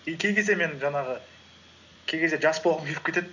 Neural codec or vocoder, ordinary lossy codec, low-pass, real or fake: none; none; 7.2 kHz; real